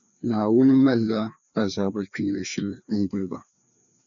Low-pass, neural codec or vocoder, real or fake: 7.2 kHz; codec, 16 kHz, 2 kbps, FreqCodec, larger model; fake